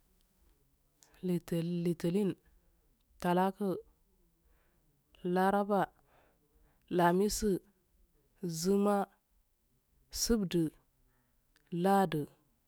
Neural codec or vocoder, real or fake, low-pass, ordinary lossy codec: autoencoder, 48 kHz, 128 numbers a frame, DAC-VAE, trained on Japanese speech; fake; none; none